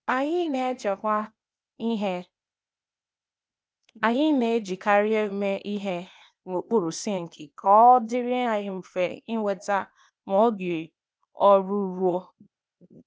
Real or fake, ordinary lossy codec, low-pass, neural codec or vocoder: fake; none; none; codec, 16 kHz, 0.8 kbps, ZipCodec